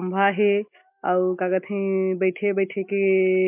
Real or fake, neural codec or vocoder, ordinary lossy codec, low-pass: real; none; MP3, 32 kbps; 3.6 kHz